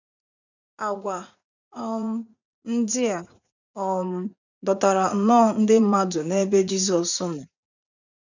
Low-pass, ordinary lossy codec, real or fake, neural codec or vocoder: 7.2 kHz; none; fake; vocoder, 44.1 kHz, 80 mel bands, Vocos